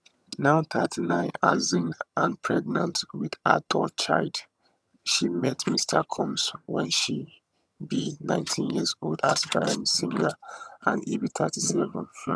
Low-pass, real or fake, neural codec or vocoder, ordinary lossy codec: none; fake; vocoder, 22.05 kHz, 80 mel bands, HiFi-GAN; none